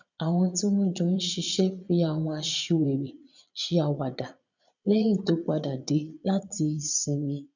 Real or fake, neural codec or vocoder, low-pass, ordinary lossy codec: fake; vocoder, 22.05 kHz, 80 mel bands, Vocos; 7.2 kHz; none